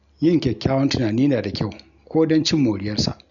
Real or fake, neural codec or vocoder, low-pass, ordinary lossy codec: real; none; 7.2 kHz; MP3, 96 kbps